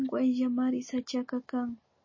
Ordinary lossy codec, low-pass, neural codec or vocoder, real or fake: MP3, 32 kbps; 7.2 kHz; none; real